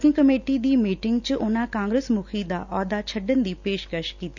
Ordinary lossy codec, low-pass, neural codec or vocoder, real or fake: none; 7.2 kHz; none; real